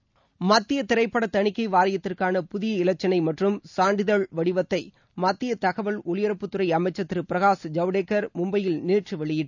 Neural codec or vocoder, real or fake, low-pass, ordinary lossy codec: none; real; 7.2 kHz; none